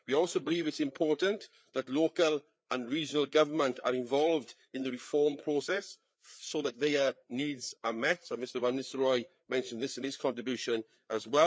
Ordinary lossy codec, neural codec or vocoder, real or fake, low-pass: none; codec, 16 kHz, 4 kbps, FreqCodec, larger model; fake; none